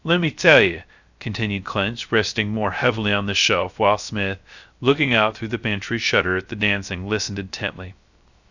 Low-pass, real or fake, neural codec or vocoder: 7.2 kHz; fake; codec, 16 kHz, 0.3 kbps, FocalCodec